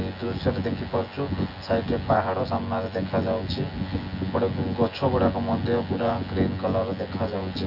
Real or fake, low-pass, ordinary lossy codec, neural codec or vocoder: fake; 5.4 kHz; AAC, 48 kbps; vocoder, 24 kHz, 100 mel bands, Vocos